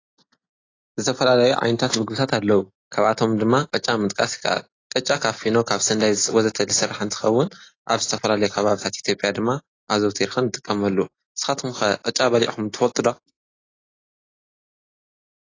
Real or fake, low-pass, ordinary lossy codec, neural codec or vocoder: real; 7.2 kHz; AAC, 32 kbps; none